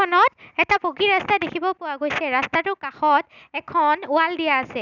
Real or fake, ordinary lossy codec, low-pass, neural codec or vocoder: real; none; 7.2 kHz; none